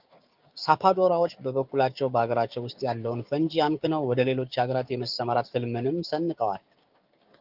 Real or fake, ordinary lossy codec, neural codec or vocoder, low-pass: fake; Opus, 24 kbps; codec, 16 kHz, 6 kbps, DAC; 5.4 kHz